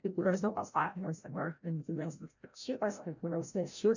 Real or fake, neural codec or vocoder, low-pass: fake; codec, 16 kHz, 0.5 kbps, FreqCodec, larger model; 7.2 kHz